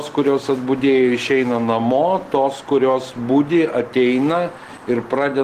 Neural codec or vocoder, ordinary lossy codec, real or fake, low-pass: none; Opus, 16 kbps; real; 14.4 kHz